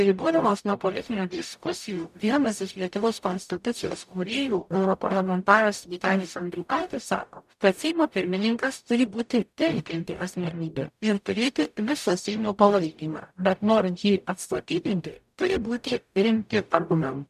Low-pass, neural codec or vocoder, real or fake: 14.4 kHz; codec, 44.1 kHz, 0.9 kbps, DAC; fake